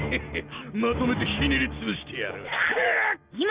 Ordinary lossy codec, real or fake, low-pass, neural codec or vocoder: Opus, 64 kbps; real; 3.6 kHz; none